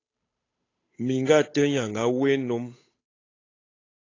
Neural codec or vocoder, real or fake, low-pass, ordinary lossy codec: codec, 16 kHz, 8 kbps, FunCodec, trained on Chinese and English, 25 frames a second; fake; 7.2 kHz; AAC, 32 kbps